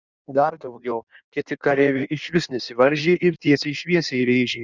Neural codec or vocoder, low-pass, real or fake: codec, 16 kHz in and 24 kHz out, 1.1 kbps, FireRedTTS-2 codec; 7.2 kHz; fake